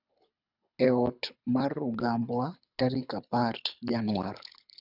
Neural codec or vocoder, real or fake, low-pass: codec, 24 kHz, 6 kbps, HILCodec; fake; 5.4 kHz